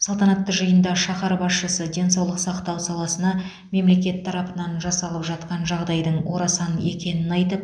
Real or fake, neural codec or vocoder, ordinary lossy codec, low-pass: real; none; none; 9.9 kHz